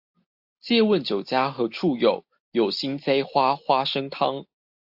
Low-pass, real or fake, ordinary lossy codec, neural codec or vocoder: 5.4 kHz; real; AAC, 48 kbps; none